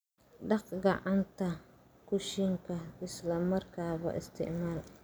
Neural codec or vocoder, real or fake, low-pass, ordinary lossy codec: none; real; none; none